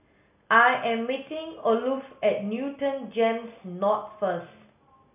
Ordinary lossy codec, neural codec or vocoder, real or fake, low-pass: none; none; real; 3.6 kHz